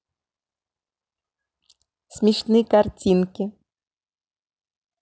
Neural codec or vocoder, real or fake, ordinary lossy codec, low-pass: none; real; none; none